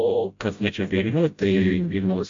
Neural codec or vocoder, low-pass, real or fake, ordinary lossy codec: codec, 16 kHz, 0.5 kbps, FreqCodec, smaller model; 7.2 kHz; fake; MP3, 48 kbps